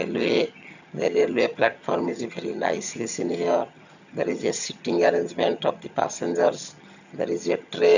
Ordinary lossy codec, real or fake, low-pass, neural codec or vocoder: none; fake; 7.2 kHz; vocoder, 22.05 kHz, 80 mel bands, HiFi-GAN